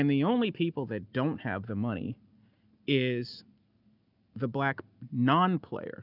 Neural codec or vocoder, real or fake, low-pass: none; real; 5.4 kHz